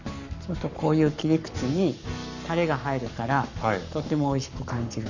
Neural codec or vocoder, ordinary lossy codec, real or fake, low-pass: codec, 44.1 kHz, 7.8 kbps, Pupu-Codec; none; fake; 7.2 kHz